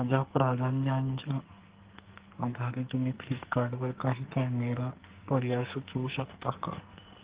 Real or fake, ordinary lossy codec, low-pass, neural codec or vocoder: fake; Opus, 16 kbps; 3.6 kHz; codec, 44.1 kHz, 2.6 kbps, SNAC